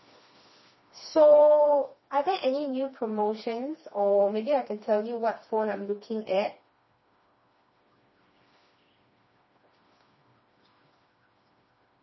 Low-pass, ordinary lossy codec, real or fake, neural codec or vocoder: 7.2 kHz; MP3, 24 kbps; fake; codec, 16 kHz, 2 kbps, FreqCodec, smaller model